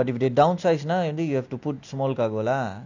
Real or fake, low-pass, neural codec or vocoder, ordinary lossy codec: real; 7.2 kHz; none; none